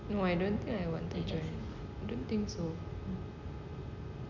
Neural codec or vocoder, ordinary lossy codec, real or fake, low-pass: none; none; real; 7.2 kHz